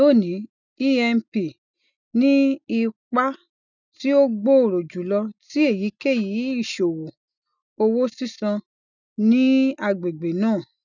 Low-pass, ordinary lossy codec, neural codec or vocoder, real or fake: 7.2 kHz; none; none; real